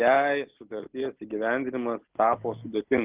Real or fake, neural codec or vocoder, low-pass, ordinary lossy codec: real; none; 3.6 kHz; Opus, 32 kbps